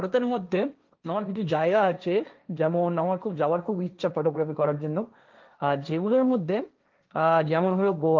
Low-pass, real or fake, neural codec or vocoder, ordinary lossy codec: 7.2 kHz; fake; codec, 16 kHz, 1.1 kbps, Voila-Tokenizer; Opus, 32 kbps